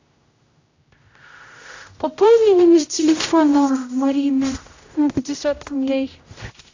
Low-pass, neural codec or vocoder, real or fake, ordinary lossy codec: 7.2 kHz; codec, 16 kHz, 0.5 kbps, X-Codec, HuBERT features, trained on general audio; fake; AAC, 48 kbps